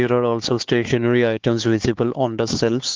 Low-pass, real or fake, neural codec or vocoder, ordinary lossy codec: 7.2 kHz; fake; codec, 16 kHz, 4 kbps, X-Codec, HuBERT features, trained on LibriSpeech; Opus, 16 kbps